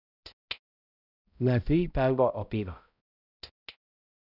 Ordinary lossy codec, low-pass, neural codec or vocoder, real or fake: none; 5.4 kHz; codec, 16 kHz, 0.5 kbps, X-Codec, HuBERT features, trained on balanced general audio; fake